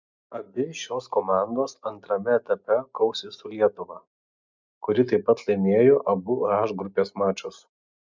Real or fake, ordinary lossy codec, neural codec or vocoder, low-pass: real; MP3, 64 kbps; none; 7.2 kHz